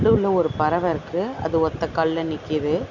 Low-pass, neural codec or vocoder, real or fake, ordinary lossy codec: 7.2 kHz; none; real; none